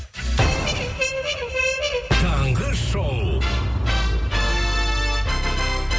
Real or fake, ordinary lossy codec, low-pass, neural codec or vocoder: real; none; none; none